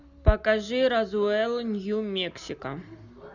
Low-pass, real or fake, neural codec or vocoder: 7.2 kHz; real; none